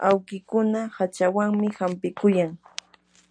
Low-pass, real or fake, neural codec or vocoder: 9.9 kHz; real; none